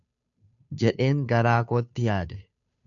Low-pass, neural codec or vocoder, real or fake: 7.2 kHz; codec, 16 kHz, 2 kbps, FunCodec, trained on Chinese and English, 25 frames a second; fake